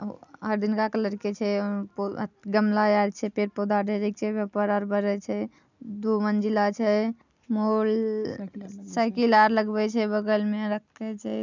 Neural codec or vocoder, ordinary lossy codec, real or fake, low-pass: none; none; real; 7.2 kHz